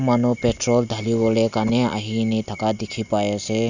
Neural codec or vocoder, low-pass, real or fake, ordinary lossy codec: none; 7.2 kHz; real; none